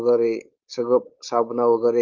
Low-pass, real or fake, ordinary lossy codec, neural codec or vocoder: 7.2 kHz; fake; Opus, 24 kbps; codec, 16 kHz, 4.8 kbps, FACodec